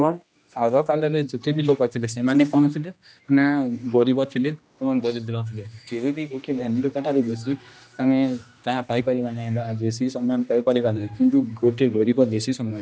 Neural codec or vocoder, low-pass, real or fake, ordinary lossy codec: codec, 16 kHz, 1 kbps, X-Codec, HuBERT features, trained on general audio; none; fake; none